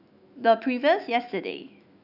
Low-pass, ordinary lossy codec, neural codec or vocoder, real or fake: 5.4 kHz; none; autoencoder, 48 kHz, 128 numbers a frame, DAC-VAE, trained on Japanese speech; fake